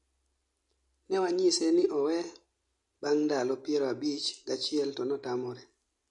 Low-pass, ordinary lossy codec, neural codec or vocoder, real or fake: 10.8 kHz; MP3, 48 kbps; none; real